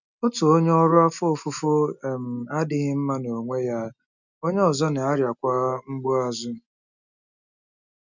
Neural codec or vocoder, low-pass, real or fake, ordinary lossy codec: none; 7.2 kHz; real; none